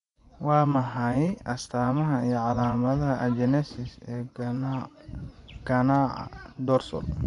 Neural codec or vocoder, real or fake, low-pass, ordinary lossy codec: vocoder, 24 kHz, 100 mel bands, Vocos; fake; 10.8 kHz; none